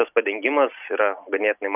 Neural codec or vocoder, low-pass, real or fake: none; 3.6 kHz; real